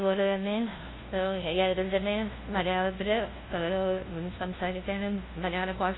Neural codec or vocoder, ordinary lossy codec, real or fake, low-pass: codec, 16 kHz, 0.5 kbps, FunCodec, trained on LibriTTS, 25 frames a second; AAC, 16 kbps; fake; 7.2 kHz